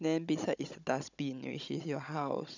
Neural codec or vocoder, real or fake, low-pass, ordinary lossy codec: codec, 16 kHz, 16 kbps, FunCodec, trained on Chinese and English, 50 frames a second; fake; 7.2 kHz; none